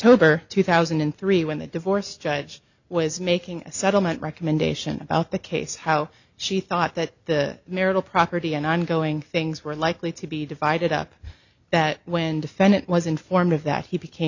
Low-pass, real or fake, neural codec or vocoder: 7.2 kHz; real; none